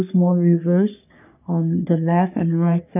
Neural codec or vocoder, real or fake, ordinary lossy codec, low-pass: codec, 44.1 kHz, 3.4 kbps, Pupu-Codec; fake; none; 3.6 kHz